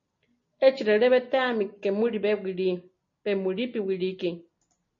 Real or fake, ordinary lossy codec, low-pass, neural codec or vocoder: real; MP3, 32 kbps; 7.2 kHz; none